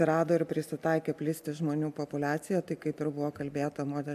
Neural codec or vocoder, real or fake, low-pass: none; real; 14.4 kHz